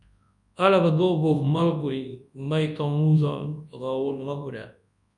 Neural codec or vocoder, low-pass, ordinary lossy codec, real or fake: codec, 24 kHz, 0.9 kbps, WavTokenizer, large speech release; 10.8 kHz; MP3, 96 kbps; fake